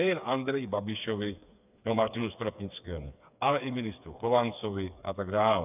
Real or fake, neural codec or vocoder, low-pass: fake; codec, 16 kHz, 4 kbps, FreqCodec, smaller model; 3.6 kHz